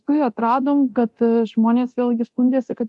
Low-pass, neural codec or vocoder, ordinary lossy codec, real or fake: 10.8 kHz; codec, 24 kHz, 0.9 kbps, DualCodec; AAC, 64 kbps; fake